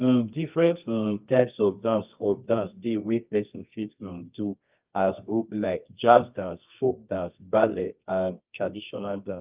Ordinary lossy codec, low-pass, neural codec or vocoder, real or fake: Opus, 24 kbps; 3.6 kHz; codec, 24 kHz, 0.9 kbps, WavTokenizer, medium music audio release; fake